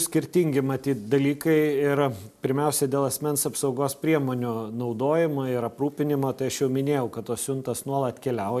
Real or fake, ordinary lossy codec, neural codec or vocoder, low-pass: real; MP3, 96 kbps; none; 14.4 kHz